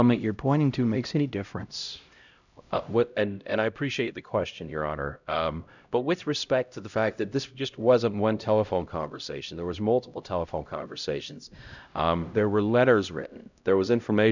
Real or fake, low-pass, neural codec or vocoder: fake; 7.2 kHz; codec, 16 kHz, 0.5 kbps, X-Codec, HuBERT features, trained on LibriSpeech